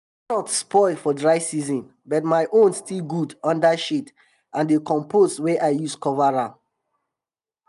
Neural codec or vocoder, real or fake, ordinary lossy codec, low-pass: none; real; none; 10.8 kHz